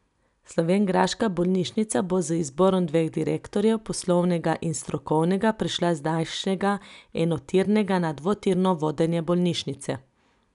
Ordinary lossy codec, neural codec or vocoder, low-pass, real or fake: none; none; 10.8 kHz; real